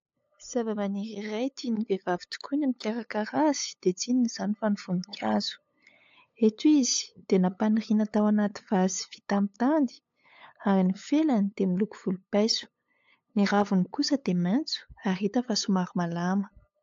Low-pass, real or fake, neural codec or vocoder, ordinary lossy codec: 7.2 kHz; fake; codec, 16 kHz, 8 kbps, FunCodec, trained on LibriTTS, 25 frames a second; AAC, 48 kbps